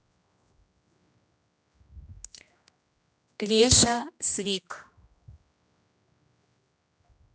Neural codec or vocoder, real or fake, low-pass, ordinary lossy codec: codec, 16 kHz, 1 kbps, X-Codec, HuBERT features, trained on general audio; fake; none; none